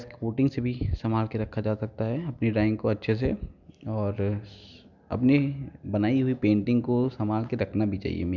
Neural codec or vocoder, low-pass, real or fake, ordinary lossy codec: none; 7.2 kHz; real; none